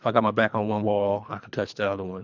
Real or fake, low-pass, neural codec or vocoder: fake; 7.2 kHz; codec, 24 kHz, 3 kbps, HILCodec